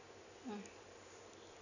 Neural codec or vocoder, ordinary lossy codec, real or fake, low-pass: none; none; real; 7.2 kHz